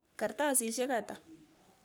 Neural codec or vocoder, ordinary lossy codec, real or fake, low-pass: codec, 44.1 kHz, 3.4 kbps, Pupu-Codec; none; fake; none